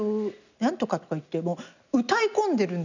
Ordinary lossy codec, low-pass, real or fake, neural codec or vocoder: none; 7.2 kHz; real; none